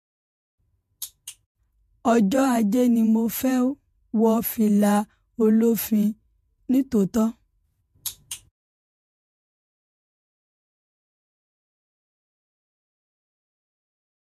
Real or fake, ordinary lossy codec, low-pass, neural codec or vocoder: fake; MP3, 64 kbps; 14.4 kHz; vocoder, 48 kHz, 128 mel bands, Vocos